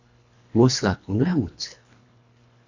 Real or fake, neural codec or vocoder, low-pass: fake; codec, 24 kHz, 1.5 kbps, HILCodec; 7.2 kHz